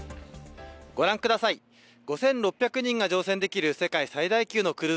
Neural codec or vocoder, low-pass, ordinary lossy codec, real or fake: none; none; none; real